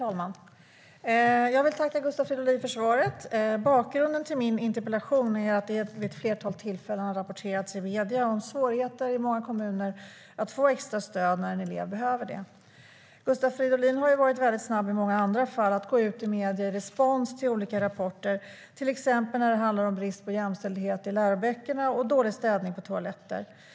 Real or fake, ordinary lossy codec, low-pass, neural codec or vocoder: real; none; none; none